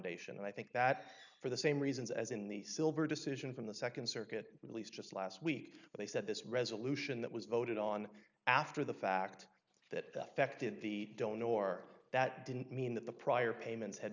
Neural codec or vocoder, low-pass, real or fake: none; 7.2 kHz; real